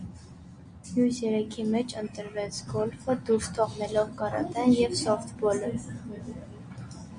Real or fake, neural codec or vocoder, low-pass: real; none; 9.9 kHz